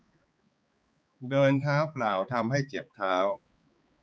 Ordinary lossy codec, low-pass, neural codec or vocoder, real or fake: none; none; codec, 16 kHz, 4 kbps, X-Codec, HuBERT features, trained on balanced general audio; fake